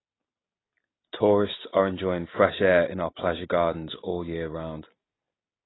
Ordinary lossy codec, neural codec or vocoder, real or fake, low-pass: AAC, 16 kbps; none; real; 7.2 kHz